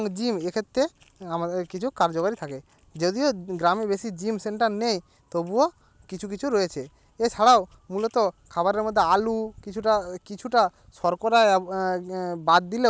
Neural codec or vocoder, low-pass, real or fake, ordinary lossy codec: none; none; real; none